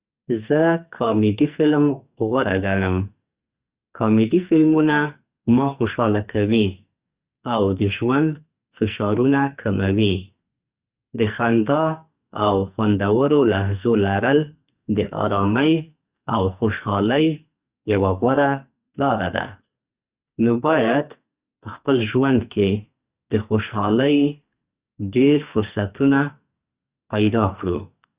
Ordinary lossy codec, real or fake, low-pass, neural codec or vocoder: Opus, 64 kbps; fake; 3.6 kHz; codec, 44.1 kHz, 2.6 kbps, SNAC